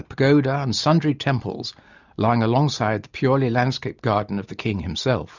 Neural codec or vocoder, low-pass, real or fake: none; 7.2 kHz; real